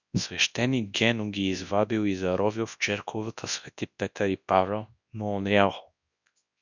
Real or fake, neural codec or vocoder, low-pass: fake; codec, 24 kHz, 0.9 kbps, WavTokenizer, large speech release; 7.2 kHz